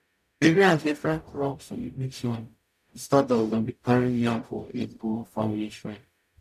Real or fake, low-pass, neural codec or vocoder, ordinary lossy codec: fake; 14.4 kHz; codec, 44.1 kHz, 0.9 kbps, DAC; none